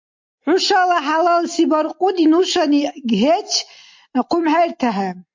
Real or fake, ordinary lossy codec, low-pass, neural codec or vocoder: real; MP3, 48 kbps; 7.2 kHz; none